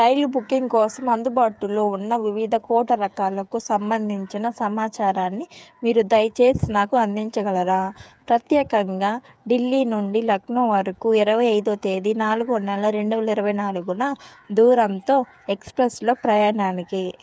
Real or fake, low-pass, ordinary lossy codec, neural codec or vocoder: fake; none; none; codec, 16 kHz, 8 kbps, FreqCodec, smaller model